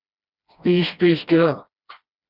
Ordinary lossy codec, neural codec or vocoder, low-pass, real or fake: Opus, 64 kbps; codec, 16 kHz, 1 kbps, FreqCodec, smaller model; 5.4 kHz; fake